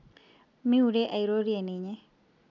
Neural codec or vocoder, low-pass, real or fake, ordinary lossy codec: none; 7.2 kHz; real; none